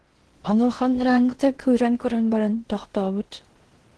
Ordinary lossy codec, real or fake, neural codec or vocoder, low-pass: Opus, 16 kbps; fake; codec, 16 kHz in and 24 kHz out, 0.6 kbps, FocalCodec, streaming, 2048 codes; 10.8 kHz